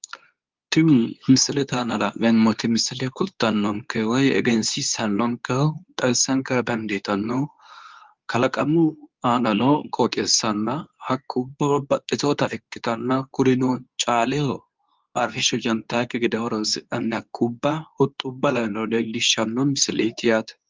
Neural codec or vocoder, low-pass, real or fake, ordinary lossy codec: codec, 24 kHz, 0.9 kbps, WavTokenizer, medium speech release version 2; 7.2 kHz; fake; Opus, 32 kbps